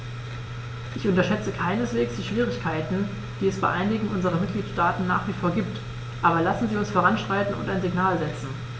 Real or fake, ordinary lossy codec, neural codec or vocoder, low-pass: real; none; none; none